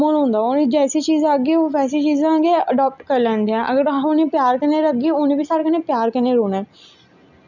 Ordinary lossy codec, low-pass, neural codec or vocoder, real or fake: none; 7.2 kHz; none; real